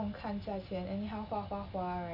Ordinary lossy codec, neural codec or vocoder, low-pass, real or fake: none; none; 5.4 kHz; real